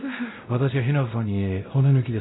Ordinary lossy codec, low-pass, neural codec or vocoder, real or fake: AAC, 16 kbps; 7.2 kHz; codec, 16 kHz, 0.5 kbps, X-Codec, WavLM features, trained on Multilingual LibriSpeech; fake